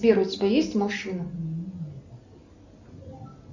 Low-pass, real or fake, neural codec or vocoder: 7.2 kHz; real; none